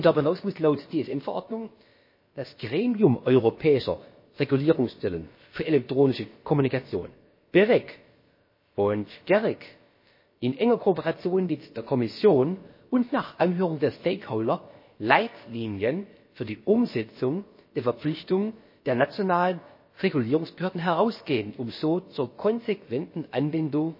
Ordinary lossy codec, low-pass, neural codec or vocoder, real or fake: MP3, 24 kbps; 5.4 kHz; codec, 16 kHz, about 1 kbps, DyCAST, with the encoder's durations; fake